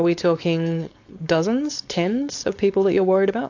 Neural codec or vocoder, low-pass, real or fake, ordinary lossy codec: codec, 16 kHz, 4.8 kbps, FACodec; 7.2 kHz; fake; AAC, 48 kbps